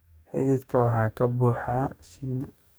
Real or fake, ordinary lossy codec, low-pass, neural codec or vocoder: fake; none; none; codec, 44.1 kHz, 2.6 kbps, DAC